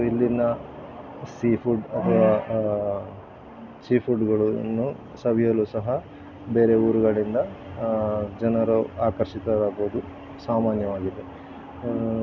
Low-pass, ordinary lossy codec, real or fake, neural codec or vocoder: 7.2 kHz; none; real; none